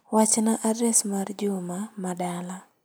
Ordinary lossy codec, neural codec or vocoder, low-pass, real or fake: none; none; none; real